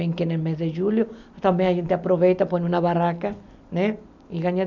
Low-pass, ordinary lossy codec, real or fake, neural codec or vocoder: 7.2 kHz; MP3, 64 kbps; real; none